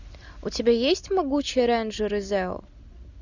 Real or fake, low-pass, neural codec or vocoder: real; 7.2 kHz; none